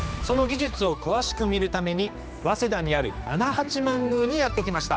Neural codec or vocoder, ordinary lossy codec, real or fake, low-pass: codec, 16 kHz, 2 kbps, X-Codec, HuBERT features, trained on general audio; none; fake; none